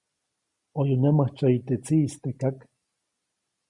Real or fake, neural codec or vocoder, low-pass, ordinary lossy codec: real; none; 10.8 kHz; Opus, 64 kbps